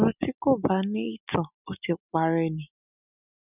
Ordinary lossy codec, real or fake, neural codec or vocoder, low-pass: none; real; none; 3.6 kHz